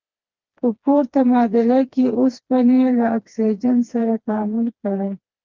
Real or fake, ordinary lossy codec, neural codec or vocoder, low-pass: fake; Opus, 24 kbps; codec, 16 kHz, 2 kbps, FreqCodec, smaller model; 7.2 kHz